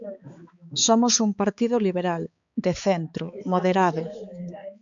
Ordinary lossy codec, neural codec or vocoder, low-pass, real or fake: MP3, 96 kbps; codec, 16 kHz, 4 kbps, X-Codec, HuBERT features, trained on balanced general audio; 7.2 kHz; fake